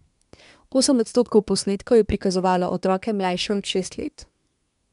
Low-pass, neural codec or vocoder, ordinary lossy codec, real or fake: 10.8 kHz; codec, 24 kHz, 1 kbps, SNAC; none; fake